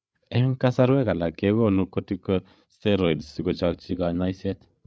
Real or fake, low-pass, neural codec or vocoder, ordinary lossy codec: fake; none; codec, 16 kHz, 8 kbps, FreqCodec, larger model; none